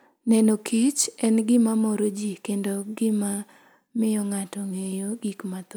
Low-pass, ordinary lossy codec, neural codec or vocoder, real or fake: none; none; none; real